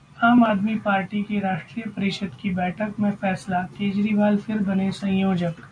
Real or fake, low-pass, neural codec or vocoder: real; 9.9 kHz; none